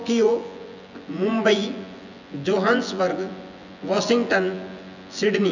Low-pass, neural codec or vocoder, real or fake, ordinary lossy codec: 7.2 kHz; vocoder, 24 kHz, 100 mel bands, Vocos; fake; none